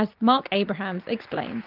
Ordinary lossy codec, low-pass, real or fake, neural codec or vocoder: Opus, 32 kbps; 5.4 kHz; real; none